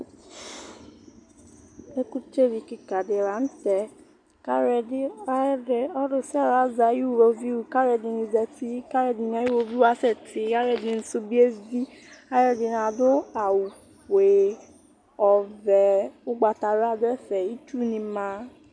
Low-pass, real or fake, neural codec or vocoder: 9.9 kHz; real; none